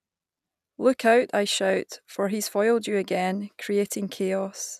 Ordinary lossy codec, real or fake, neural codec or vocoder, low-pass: none; real; none; 14.4 kHz